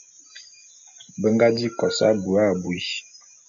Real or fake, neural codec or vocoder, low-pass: real; none; 7.2 kHz